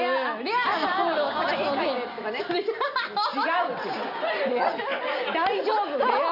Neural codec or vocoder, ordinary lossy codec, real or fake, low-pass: none; none; real; 5.4 kHz